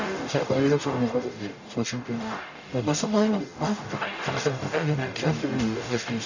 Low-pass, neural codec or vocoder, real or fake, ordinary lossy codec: 7.2 kHz; codec, 44.1 kHz, 0.9 kbps, DAC; fake; none